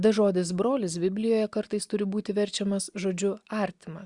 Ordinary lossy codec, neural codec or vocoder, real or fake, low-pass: Opus, 64 kbps; none; real; 10.8 kHz